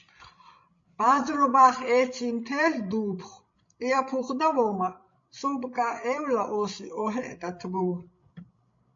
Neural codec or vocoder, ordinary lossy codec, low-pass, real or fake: codec, 16 kHz, 16 kbps, FreqCodec, larger model; MP3, 48 kbps; 7.2 kHz; fake